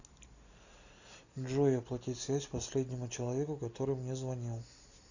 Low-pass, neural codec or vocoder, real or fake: 7.2 kHz; none; real